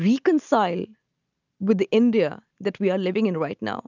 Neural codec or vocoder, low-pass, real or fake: none; 7.2 kHz; real